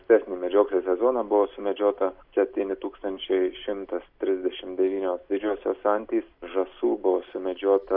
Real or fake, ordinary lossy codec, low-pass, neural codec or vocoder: real; MP3, 48 kbps; 5.4 kHz; none